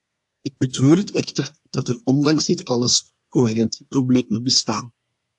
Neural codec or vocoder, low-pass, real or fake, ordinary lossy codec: codec, 24 kHz, 1 kbps, SNAC; 10.8 kHz; fake; AAC, 64 kbps